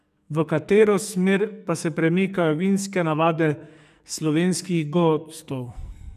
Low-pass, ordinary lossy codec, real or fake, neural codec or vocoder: 14.4 kHz; none; fake; codec, 44.1 kHz, 2.6 kbps, SNAC